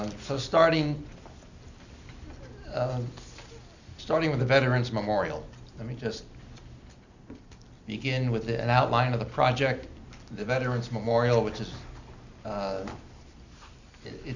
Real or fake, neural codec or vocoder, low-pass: real; none; 7.2 kHz